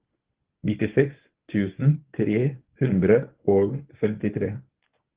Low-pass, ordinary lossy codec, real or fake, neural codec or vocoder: 3.6 kHz; Opus, 16 kbps; fake; codec, 24 kHz, 0.9 kbps, WavTokenizer, medium speech release version 1